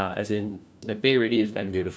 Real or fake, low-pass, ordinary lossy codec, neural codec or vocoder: fake; none; none; codec, 16 kHz, 1 kbps, FunCodec, trained on LibriTTS, 50 frames a second